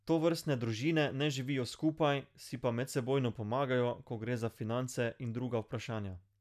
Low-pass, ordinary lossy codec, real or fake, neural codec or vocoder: 14.4 kHz; none; real; none